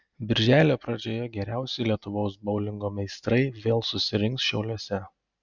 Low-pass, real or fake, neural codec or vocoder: 7.2 kHz; real; none